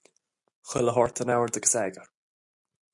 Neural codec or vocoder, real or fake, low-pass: none; real; 10.8 kHz